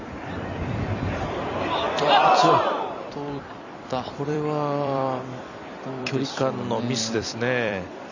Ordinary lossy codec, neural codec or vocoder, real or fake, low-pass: none; vocoder, 44.1 kHz, 128 mel bands every 256 samples, BigVGAN v2; fake; 7.2 kHz